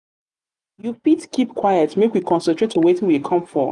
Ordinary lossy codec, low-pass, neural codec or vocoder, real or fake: none; 10.8 kHz; none; real